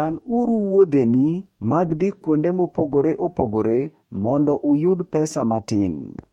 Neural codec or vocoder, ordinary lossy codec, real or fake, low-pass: codec, 44.1 kHz, 2.6 kbps, DAC; MP3, 64 kbps; fake; 19.8 kHz